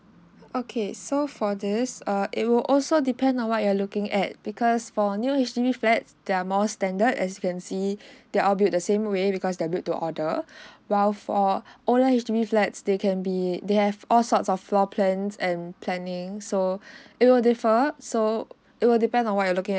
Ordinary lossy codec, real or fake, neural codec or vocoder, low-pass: none; real; none; none